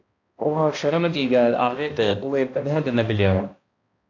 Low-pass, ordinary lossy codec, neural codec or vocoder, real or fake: 7.2 kHz; AAC, 48 kbps; codec, 16 kHz, 1 kbps, X-Codec, HuBERT features, trained on balanced general audio; fake